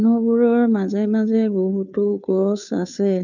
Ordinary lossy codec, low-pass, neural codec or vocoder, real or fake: none; 7.2 kHz; codec, 16 kHz, 2 kbps, FunCodec, trained on Chinese and English, 25 frames a second; fake